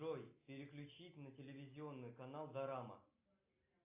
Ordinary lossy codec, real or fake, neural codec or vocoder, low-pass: AAC, 32 kbps; real; none; 3.6 kHz